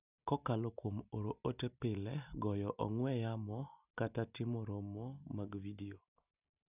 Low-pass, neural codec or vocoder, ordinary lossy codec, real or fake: 3.6 kHz; none; none; real